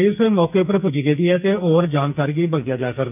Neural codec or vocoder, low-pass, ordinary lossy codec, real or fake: codec, 44.1 kHz, 2.6 kbps, SNAC; 3.6 kHz; none; fake